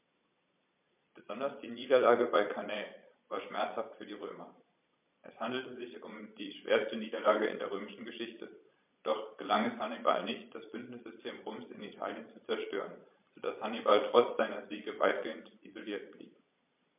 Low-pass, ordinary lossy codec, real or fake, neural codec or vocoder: 3.6 kHz; MP3, 32 kbps; fake; vocoder, 22.05 kHz, 80 mel bands, Vocos